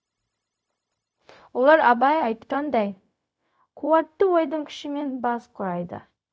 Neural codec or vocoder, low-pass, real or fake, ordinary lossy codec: codec, 16 kHz, 0.4 kbps, LongCat-Audio-Codec; none; fake; none